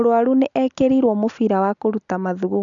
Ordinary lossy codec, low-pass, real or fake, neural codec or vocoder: MP3, 96 kbps; 7.2 kHz; real; none